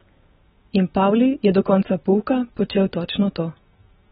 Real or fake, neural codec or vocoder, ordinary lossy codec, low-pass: real; none; AAC, 16 kbps; 7.2 kHz